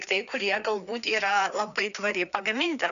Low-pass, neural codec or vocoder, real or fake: 7.2 kHz; codec, 16 kHz, 2 kbps, FreqCodec, larger model; fake